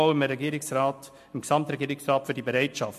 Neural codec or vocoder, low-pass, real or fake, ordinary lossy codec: none; 14.4 kHz; real; MP3, 64 kbps